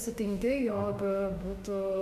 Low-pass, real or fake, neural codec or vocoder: 14.4 kHz; fake; autoencoder, 48 kHz, 32 numbers a frame, DAC-VAE, trained on Japanese speech